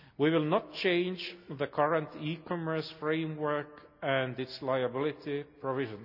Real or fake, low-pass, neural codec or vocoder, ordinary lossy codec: real; 5.4 kHz; none; none